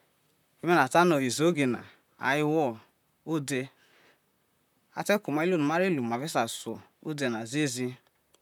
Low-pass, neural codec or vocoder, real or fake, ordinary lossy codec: 19.8 kHz; vocoder, 44.1 kHz, 128 mel bands, Pupu-Vocoder; fake; none